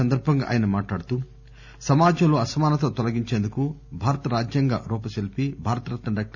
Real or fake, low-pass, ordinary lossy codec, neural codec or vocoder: real; 7.2 kHz; none; none